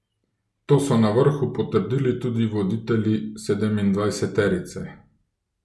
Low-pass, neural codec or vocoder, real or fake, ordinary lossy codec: none; none; real; none